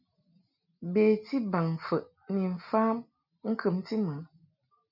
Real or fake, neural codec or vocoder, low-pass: real; none; 5.4 kHz